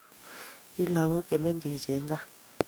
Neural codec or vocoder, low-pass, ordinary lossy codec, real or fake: codec, 44.1 kHz, 2.6 kbps, DAC; none; none; fake